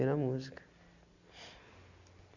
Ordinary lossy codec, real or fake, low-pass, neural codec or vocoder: none; real; 7.2 kHz; none